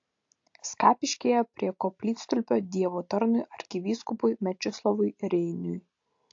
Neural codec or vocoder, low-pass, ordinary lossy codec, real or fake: none; 7.2 kHz; AAC, 48 kbps; real